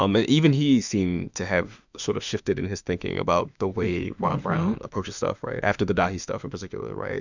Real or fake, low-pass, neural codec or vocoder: fake; 7.2 kHz; autoencoder, 48 kHz, 32 numbers a frame, DAC-VAE, trained on Japanese speech